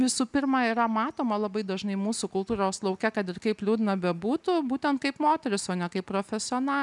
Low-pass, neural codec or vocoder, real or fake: 10.8 kHz; none; real